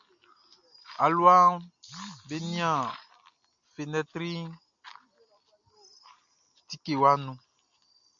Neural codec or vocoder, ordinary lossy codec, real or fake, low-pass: none; MP3, 64 kbps; real; 7.2 kHz